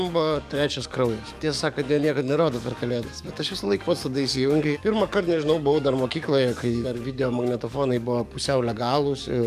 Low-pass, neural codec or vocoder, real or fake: 14.4 kHz; codec, 44.1 kHz, 7.8 kbps, DAC; fake